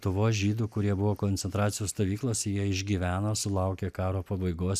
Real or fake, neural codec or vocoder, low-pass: real; none; 14.4 kHz